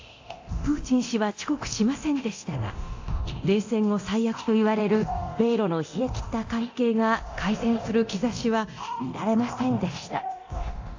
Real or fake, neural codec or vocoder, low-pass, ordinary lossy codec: fake; codec, 24 kHz, 0.9 kbps, DualCodec; 7.2 kHz; AAC, 48 kbps